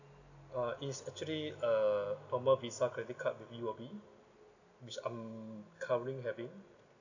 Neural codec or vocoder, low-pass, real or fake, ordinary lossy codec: none; 7.2 kHz; real; none